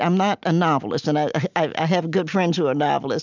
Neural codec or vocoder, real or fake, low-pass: none; real; 7.2 kHz